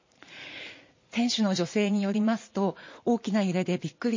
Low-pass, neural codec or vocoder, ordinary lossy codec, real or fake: 7.2 kHz; vocoder, 44.1 kHz, 80 mel bands, Vocos; MP3, 32 kbps; fake